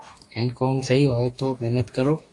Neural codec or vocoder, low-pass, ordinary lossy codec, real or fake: codec, 44.1 kHz, 2.6 kbps, DAC; 10.8 kHz; AAC, 48 kbps; fake